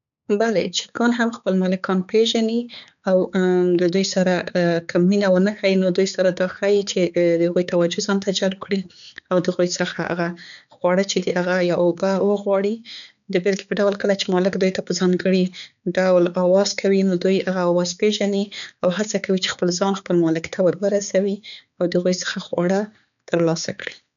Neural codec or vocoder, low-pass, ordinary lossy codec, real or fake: codec, 16 kHz, 4 kbps, X-Codec, HuBERT features, trained on balanced general audio; 7.2 kHz; none; fake